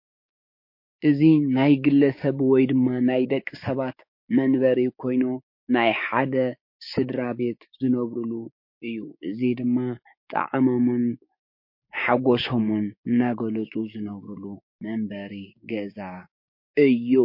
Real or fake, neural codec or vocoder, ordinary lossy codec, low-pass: fake; codec, 16 kHz, 6 kbps, DAC; MP3, 32 kbps; 5.4 kHz